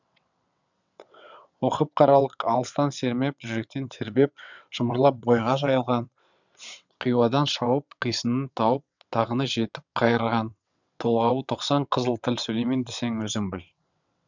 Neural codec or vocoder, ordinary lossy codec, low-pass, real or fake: vocoder, 22.05 kHz, 80 mel bands, WaveNeXt; none; 7.2 kHz; fake